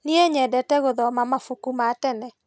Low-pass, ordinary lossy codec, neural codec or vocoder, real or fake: none; none; none; real